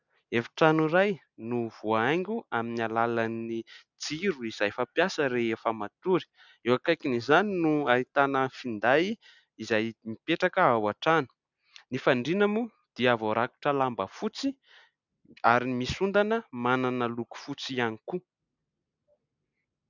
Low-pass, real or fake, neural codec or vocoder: 7.2 kHz; real; none